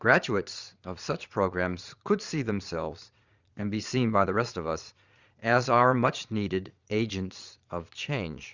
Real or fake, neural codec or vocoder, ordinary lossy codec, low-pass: fake; vocoder, 22.05 kHz, 80 mel bands, Vocos; Opus, 64 kbps; 7.2 kHz